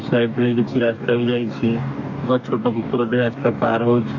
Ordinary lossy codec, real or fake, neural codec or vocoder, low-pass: MP3, 48 kbps; fake; codec, 44.1 kHz, 2.6 kbps, DAC; 7.2 kHz